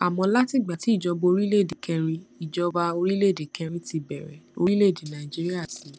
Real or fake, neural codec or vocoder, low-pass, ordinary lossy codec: real; none; none; none